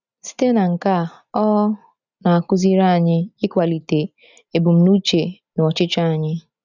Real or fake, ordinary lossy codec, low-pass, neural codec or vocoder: real; none; 7.2 kHz; none